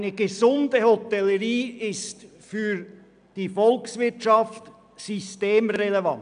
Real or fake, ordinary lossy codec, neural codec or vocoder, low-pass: real; none; none; 9.9 kHz